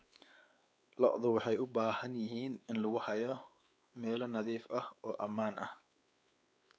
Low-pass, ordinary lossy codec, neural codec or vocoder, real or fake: none; none; codec, 16 kHz, 4 kbps, X-Codec, WavLM features, trained on Multilingual LibriSpeech; fake